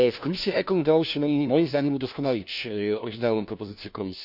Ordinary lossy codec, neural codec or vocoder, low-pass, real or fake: none; codec, 16 kHz, 1 kbps, FunCodec, trained on LibriTTS, 50 frames a second; 5.4 kHz; fake